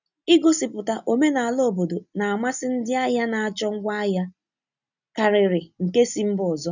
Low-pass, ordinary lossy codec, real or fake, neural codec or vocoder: 7.2 kHz; none; real; none